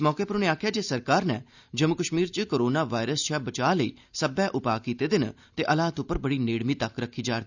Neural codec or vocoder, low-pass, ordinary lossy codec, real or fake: none; 7.2 kHz; none; real